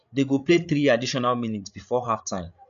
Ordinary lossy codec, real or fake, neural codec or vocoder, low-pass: none; fake; codec, 16 kHz, 8 kbps, FreqCodec, larger model; 7.2 kHz